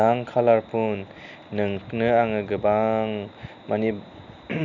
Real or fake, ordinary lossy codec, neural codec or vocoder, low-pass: real; none; none; 7.2 kHz